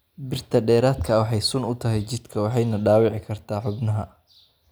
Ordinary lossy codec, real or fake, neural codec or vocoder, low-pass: none; real; none; none